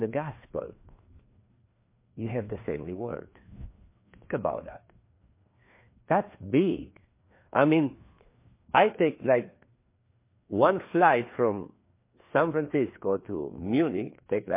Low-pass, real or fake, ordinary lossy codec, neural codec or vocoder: 3.6 kHz; fake; MP3, 24 kbps; codec, 16 kHz, 2 kbps, FreqCodec, larger model